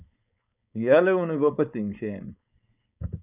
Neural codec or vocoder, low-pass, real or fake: codec, 16 kHz, 4.8 kbps, FACodec; 3.6 kHz; fake